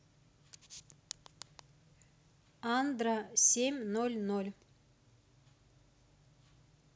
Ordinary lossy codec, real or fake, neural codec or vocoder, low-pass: none; real; none; none